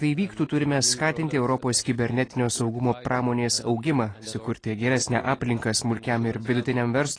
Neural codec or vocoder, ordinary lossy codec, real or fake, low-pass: none; AAC, 32 kbps; real; 9.9 kHz